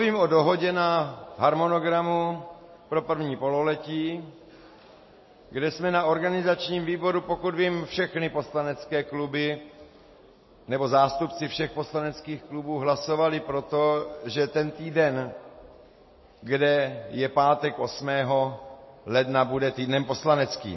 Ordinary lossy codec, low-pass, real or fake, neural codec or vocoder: MP3, 24 kbps; 7.2 kHz; real; none